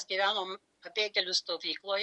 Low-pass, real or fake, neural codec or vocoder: 10.8 kHz; real; none